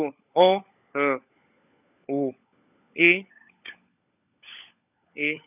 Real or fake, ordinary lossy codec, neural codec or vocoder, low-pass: fake; none; codec, 16 kHz, 16 kbps, FunCodec, trained on LibriTTS, 50 frames a second; 3.6 kHz